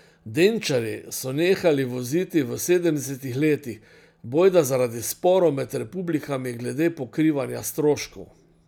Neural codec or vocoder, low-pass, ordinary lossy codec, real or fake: none; 19.8 kHz; none; real